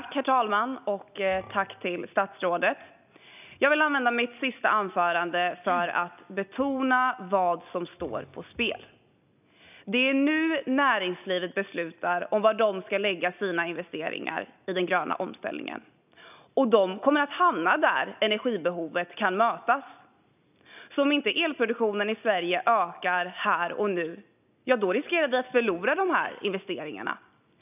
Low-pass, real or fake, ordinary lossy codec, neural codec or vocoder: 3.6 kHz; real; none; none